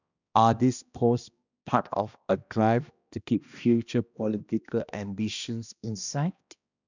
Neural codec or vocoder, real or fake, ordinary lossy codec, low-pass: codec, 16 kHz, 1 kbps, X-Codec, HuBERT features, trained on balanced general audio; fake; none; 7.2 kHz